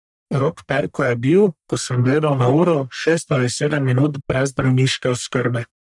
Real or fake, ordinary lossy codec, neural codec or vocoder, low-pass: fake; none; codec, 44.1 kHz, 1.7 kbps, Pupu-Codec; 10.8 kHz